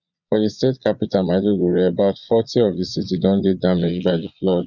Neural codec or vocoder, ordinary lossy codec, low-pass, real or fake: vocoder, 22.05 kHz, 80 mel bands, Vocos; none; 7.2 kHz; fake